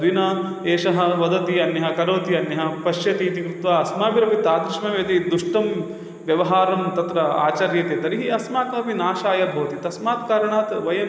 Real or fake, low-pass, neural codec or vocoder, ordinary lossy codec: real; none; none; none